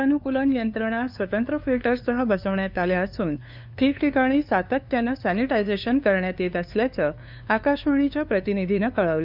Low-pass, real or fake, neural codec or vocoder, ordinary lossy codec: 5.4 kHz; fake; codec, 16 kHz, 2 kbps, FunCodec, trained on LibriTTS, 25 frames a second; none